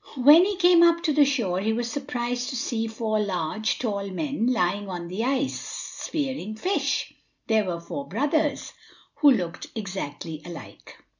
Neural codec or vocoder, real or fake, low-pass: none; real; 7.2 kHz